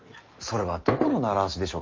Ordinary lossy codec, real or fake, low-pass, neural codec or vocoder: Opus, 24 kbps; real; 7.2 kHz; none